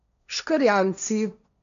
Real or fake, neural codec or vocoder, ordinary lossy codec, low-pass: fake; codec, 16 kHz, 1.1 kbps, Voila-Tokenizer; none; 7.2 kHz